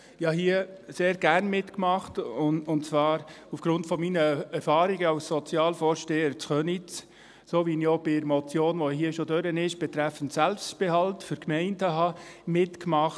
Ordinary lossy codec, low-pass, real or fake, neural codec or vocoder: none; none; real; none